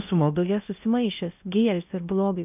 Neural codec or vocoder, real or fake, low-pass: codec, 16 kHz in and 24 kHz out, 0.6 kbps, FocalCodec, streaming, 2048 codes; fake; 3.6 kHz